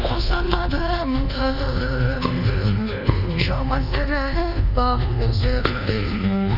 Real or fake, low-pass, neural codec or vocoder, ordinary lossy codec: fake; 5.4 kHz; codec, 24 kHz, 1.2 kbps, DualCodec; none